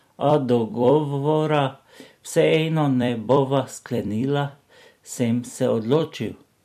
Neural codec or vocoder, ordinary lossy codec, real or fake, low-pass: vocoder, 44.1 kHz, 128 mel bands every 256 samples, BigVGAN v2; MP3, 64 kbps; fake; 14.4 kHz